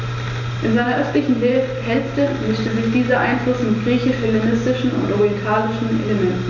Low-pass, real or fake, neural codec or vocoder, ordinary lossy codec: 7.2 kHz; real; none; none